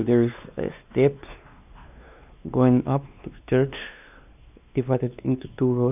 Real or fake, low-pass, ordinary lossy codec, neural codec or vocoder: fake; 3.6 kHz; none; codec, 16 kHz, 2 kbps, X-Codec, WavLM features, trained on Multilingual LibriSpeech